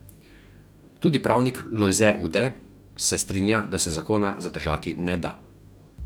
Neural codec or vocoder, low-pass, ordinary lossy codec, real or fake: codec, 44.1 kHz, 2.6 kbps, DAC; none; none; fake